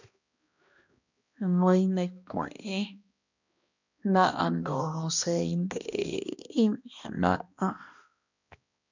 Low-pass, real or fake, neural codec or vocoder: 7.2 kHz; fake; codec, 16 kHz, 1 kbps, X-Codec, HuBERT features, trained on balanced general audio